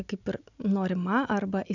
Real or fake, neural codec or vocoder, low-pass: real; none; 7.2 kHz